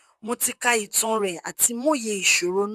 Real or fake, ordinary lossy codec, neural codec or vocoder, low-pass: fake; none; vocoder, 44.1 kHz, 128 mel bands, Pupu-Vocoder; 14.4 kHz